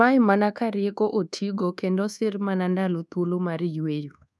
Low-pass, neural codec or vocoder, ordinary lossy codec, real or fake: none; codec, 24 kHz, 1.2 kbps, DualCodec; none; fake